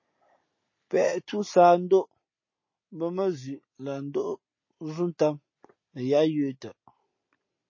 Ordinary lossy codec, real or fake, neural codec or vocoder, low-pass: MP3, 32 kbps; real; none; 7.2 kHz